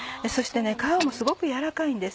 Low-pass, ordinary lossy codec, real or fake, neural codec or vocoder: none; none; real; none